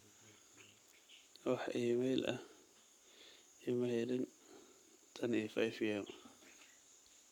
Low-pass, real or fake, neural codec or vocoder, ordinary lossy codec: 19.8 kHz; fake; codec, 44.1 kHz, 7.8 kbps, Pupu-Codec; none